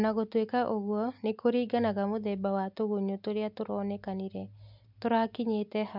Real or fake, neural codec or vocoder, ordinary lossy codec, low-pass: real; none; MP3, 48 kbps; 5.4 kHz